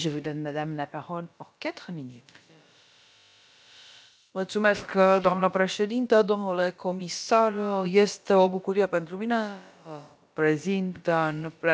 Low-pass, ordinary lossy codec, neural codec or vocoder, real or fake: none; none; codec, 16 kHz, about 1 kbps, DyCAST, with the encoder's durations; fake